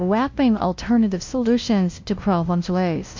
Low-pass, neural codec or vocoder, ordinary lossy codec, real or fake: 7.2 kHz; codec, 16 kHz, 0.5 kbps, FunCodec, trained on LibriTTS, 25 frames a second; MP3, 48 kbps; fake